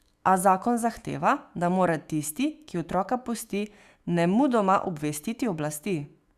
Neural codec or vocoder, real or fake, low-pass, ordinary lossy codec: autoencoder, 48 kHz, 128 numbers a frame, DAC-VAE, trained on Japanese speech; fake; 14.4 kHz; Opus, 64 kbps